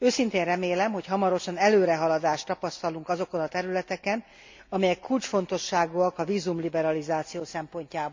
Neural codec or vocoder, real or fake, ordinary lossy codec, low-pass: none; real; MP3, 48 kbps; 7.2 kHz